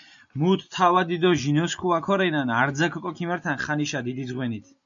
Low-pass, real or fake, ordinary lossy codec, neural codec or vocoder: 7.2 kHz; real; AAC, 64 kbps; none